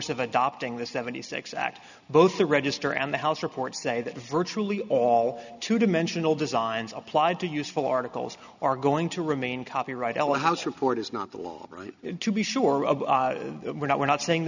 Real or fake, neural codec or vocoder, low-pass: real; none; 7.2 kHz